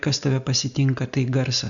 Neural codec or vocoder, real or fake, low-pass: none; real; 7.2 kHz